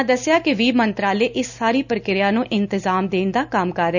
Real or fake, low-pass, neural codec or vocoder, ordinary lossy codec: real; 7.2 kHz; none; none